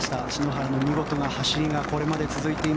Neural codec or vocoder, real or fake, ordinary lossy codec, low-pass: none; real; none; none